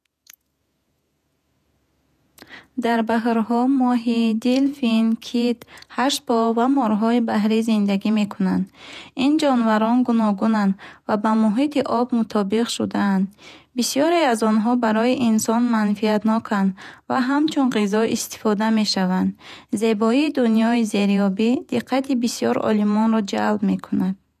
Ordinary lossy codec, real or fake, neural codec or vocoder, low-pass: none; fake; vocoder, 48 kHz, 128 mel bands, Vocos; 14.4 kHz